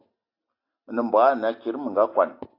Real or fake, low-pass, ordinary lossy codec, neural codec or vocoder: real; 5.4 kHz; AAC, 32 kbps; none